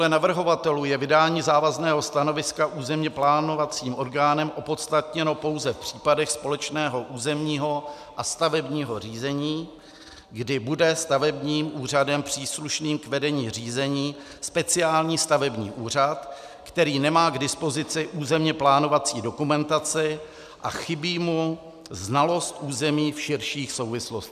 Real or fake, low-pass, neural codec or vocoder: real; 14.4 kHz; none